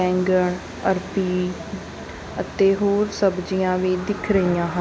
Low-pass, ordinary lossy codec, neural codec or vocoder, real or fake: none; none; none; real